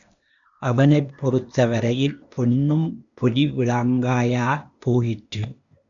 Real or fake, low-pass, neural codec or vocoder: fake; 7.2 kHz; codec, 16 kHz, 0.8 kbps, ZipCodec